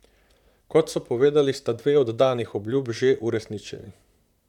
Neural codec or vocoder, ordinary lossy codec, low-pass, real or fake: vocoder, 44.1 kHz, 128 mel bands, Pupu-Vocoder; none; 19.8 kHz; fake